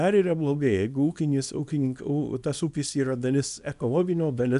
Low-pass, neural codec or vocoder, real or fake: 10.8 kHz; codec, 24 kHz, 0.9 kbps, WavTokenizer, small release; fake